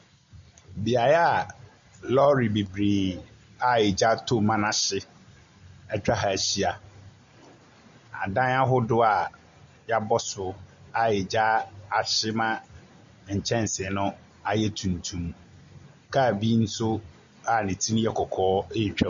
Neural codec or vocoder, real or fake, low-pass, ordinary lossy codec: none; real; 7.2 kHz; Opus, 64 kbps